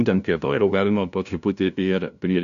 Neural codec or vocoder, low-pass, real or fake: codec, 16 kHz, 0.5 kbps, FunCodec, trained on LibriTTS, 25 frames a second; 7.2 kHz; fake